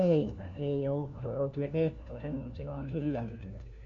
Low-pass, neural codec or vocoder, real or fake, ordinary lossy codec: 7.2 kHz; codec, 16 kHz, 1 kbps, FunCodec, trained on LibriTTS, 50 frames a second; fake; MP3, 96 kbps